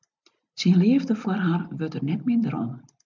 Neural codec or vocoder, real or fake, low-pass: none; real; 7.2 kHz